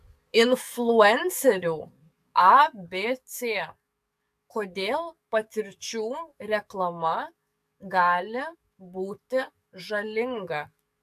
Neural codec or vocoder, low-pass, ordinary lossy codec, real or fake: codec, 44.1 kHz, 7.8 kbps, DAC; 14.4 kHz; AAC, 96 kbps; fake